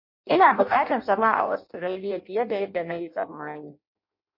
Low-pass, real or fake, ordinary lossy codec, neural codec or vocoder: 5.4 kHz; fake; MP3, 32 kbps; codec, 16 kHz in and 24 kHz out, 0.6 kbps, FireRedTTS-2 codec